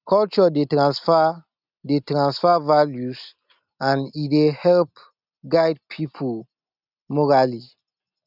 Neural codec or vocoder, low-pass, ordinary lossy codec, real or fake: none; 5.4 kHz; none; real